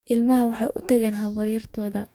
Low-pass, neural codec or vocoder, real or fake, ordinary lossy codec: 19.8 kHz; codec, 44.1 kHz, 2.6 kbps, DAC; fake; none